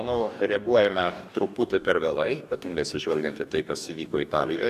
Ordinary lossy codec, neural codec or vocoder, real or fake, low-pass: AAC, 96 kbps; codec, 44.1 kHz, 2.6 kbps, DAC; fake; 14.4 kHz